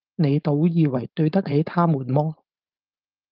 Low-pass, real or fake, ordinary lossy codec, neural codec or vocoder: 5.4 kHz; fake; Opus, 24 kbps; codec, 16 kHz, 4.8 kbps, FACodec